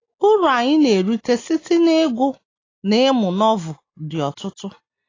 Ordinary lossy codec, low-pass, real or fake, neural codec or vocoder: AAC, 32 kbps; 7.2 kHz; real; none